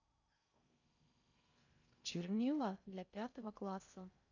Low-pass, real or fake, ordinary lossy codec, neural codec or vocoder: 7.2 kHz; fake; none; codec, 16 kHz in and 24 kHz out, 0.6 kbps, FocalCodec, streaming, 4096 codes